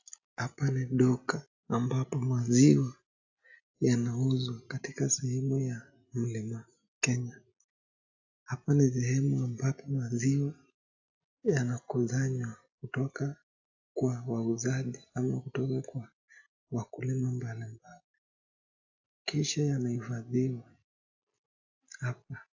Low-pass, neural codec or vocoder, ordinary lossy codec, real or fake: 7.2 kHz; none; AAC, 48 kbps; real